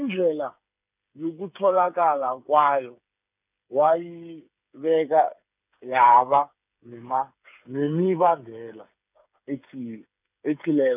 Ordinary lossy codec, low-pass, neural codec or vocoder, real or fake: none; 3.6 kHz; codec, 16 kHz, 8 kbps, FreqCodec, smaller model; fake